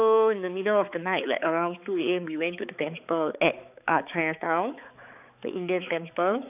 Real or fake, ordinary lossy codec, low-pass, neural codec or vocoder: fake; none; 3.6 kHz; codec, 16 kHz, 4 kbps, X-Codec, HuBERT features, trained on balanced general audio